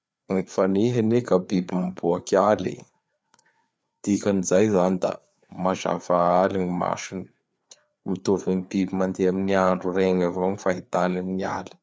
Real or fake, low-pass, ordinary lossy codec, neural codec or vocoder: fake; none; none; codec, 16 kHz, 4 kbps, FreqCodec, larger model